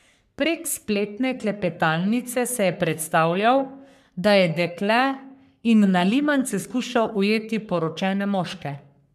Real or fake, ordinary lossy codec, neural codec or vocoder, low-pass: fake; none; codec, 44.1 kHz, 3.4 kbps, Pupu-Codec; 14.4 kHz